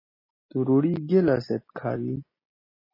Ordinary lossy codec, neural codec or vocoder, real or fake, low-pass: MP3, 24 kbps; none; real; 5.4 kHz